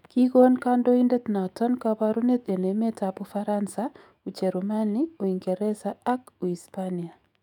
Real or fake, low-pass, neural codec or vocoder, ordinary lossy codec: fake; 19.8 kHz; autoencoder, 48 kHz, 128 numbers a frame, DAC-VAE, trained on Japanese speech; none